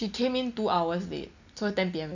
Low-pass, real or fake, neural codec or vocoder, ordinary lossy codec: 7.2 kHz; real; none; none